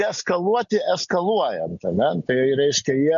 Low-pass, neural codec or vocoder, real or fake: 7.2 kHz; none; real